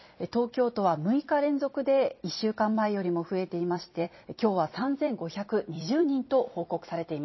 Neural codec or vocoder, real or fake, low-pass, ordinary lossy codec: vocoder, 44.1 kHz, 128 mel bands every 256 samples, BigVGAN v2; fake; 7.2 kHz; MP3, 24 kbps